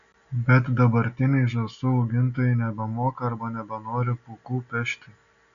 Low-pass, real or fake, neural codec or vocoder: 7.2 kHz; real; none